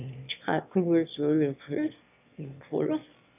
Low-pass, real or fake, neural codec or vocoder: 3.6 kHz; fake; autoencoder, 22.05 kHz, a latent of 192 numbers a frame, VITS, trained on one speaker